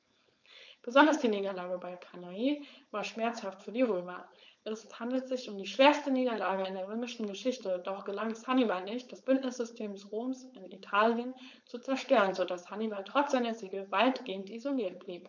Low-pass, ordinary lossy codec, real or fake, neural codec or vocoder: 7.2 kHz; none; fake; codec, 16 kHz, 4.8 kbps, FACodec